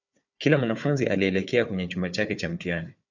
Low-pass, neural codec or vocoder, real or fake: 7.2 kHz; codec, 16 kHz, 4 kbps, FunCodec, trained on Chinese and English, 50 frames a second; fake